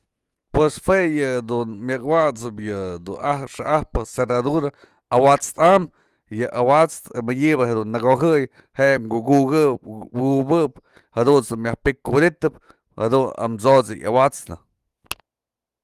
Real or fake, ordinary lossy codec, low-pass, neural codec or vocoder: fake; Opus, 24 kbps; 14.4 kHz; autoencoder, 48 kHz, 128 numbers a frame, DAC-VAE, trained on Japanese speech